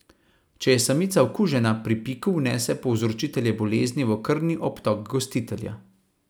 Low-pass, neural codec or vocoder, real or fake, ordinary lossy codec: none; none; real; none